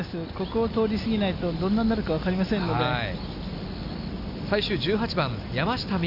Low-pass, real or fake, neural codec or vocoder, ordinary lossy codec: 5.4 kHz; real; none; none